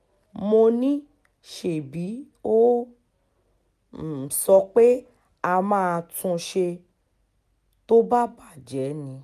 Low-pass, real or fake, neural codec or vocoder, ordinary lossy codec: 14.4 kHz; real; none; none